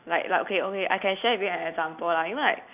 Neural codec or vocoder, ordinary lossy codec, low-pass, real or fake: none; none; 3.6 kHz; real